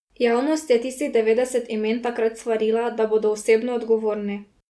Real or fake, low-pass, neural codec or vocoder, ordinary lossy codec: real; none; none; none